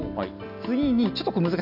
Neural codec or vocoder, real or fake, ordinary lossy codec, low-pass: none; real; none; 5.4 kHz